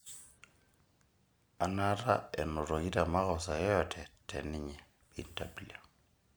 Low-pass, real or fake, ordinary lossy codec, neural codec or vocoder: none; real; none; none